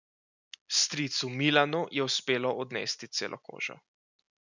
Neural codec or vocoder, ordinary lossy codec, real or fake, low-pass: none; none; real; 7.2 kHz